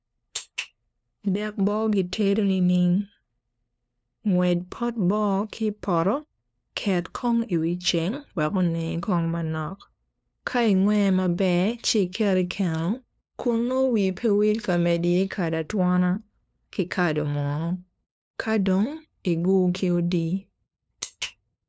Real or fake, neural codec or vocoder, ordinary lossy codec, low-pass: fake; codec, 16 kHz, 2 kbps, FunCodec, trained on LibriTTS, 25 frames a second; none; none